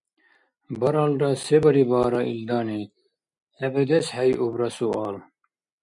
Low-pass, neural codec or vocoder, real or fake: 10.8 kHz; none; real